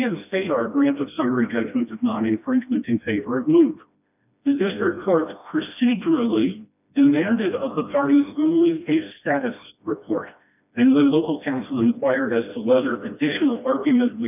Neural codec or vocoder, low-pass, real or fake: codec, 16 kHz, 1 kbps, FreqCodec, smaller model; 3.6 kHz; fake